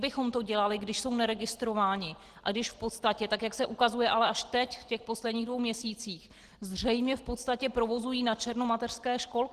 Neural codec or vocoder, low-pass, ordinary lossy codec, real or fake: none; 14.4 kHz; Opus, 16 kbps; real